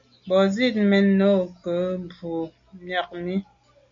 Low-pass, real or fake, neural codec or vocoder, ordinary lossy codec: 7.2 kHz; real; none; MP3, 48 kbps